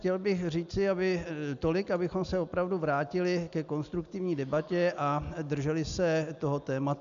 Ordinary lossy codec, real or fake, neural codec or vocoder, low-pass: AAC, 96 kbps; real; none; 7.2 kHz